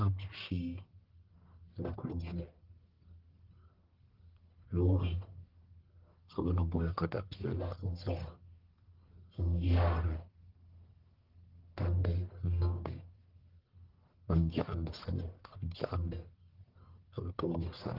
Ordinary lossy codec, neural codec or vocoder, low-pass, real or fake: Opus, 16 kbps; codec, 44.1 kHz, 1.7 kbps, Pupu-Codec; 5.4 kHz; fake